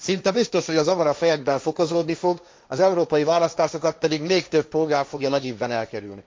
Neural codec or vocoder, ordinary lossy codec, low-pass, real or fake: codec, 16 kHz, 1.1 kbps, Voila-Tokenizer; none; none; fake